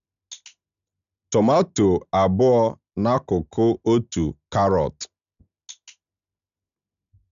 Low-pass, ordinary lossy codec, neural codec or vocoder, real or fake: 7.2 kHz; none; none; real